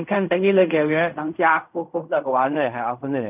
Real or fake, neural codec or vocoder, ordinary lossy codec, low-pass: fake; codec, 16 kHz in and 24 kHz out, 0.4 kbps, LongCat-Audio-Codec, fine tuned four codebook decoder; none; 3.6 kHz